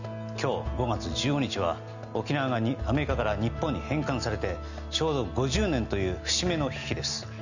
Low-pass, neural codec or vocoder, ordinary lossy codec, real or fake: 7.2 kHz; none; none; real